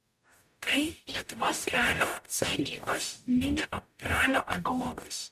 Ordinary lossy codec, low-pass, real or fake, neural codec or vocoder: MP3, 96 kbps; 14.4 kHz; fake; codec, 44.1 kHz, 0.9 kbps, DAC